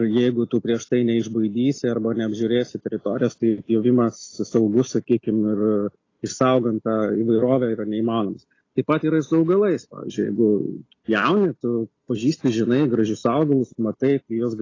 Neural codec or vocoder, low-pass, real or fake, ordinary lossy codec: none; 7.2 kHz; real; AAC, 32 kbps